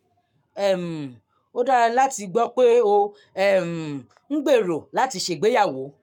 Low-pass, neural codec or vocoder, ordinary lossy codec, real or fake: 19.8 kHz; codec, 44.1 kHz, 7.8 kbps, Pupu-Codec; none; fake